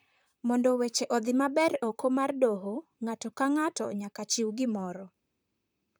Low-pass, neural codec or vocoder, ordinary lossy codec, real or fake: none; vocoder, 44.1 kHz, 128 mel bands, Pupu-Vocoder; none; fake